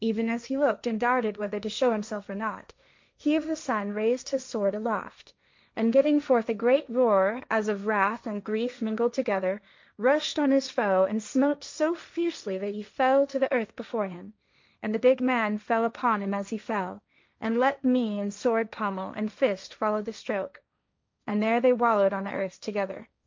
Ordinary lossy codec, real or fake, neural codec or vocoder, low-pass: MP3, 64 kbps; fake; codec, 16 kHz, 1.1 kbps, Voila-Tokenizer; 7.2 kHz